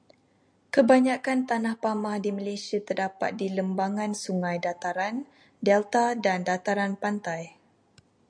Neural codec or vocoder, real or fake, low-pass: none; real; 9.9 kHz